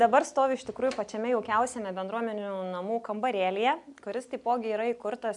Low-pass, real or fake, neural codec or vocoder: 10.8 kHz; real; none